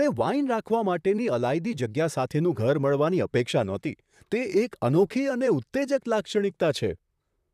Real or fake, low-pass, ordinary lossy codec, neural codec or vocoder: fake; 14.4 kHz; none; vocoder, 44.1 kHz, 128 mel bands, Pupu-Vocoder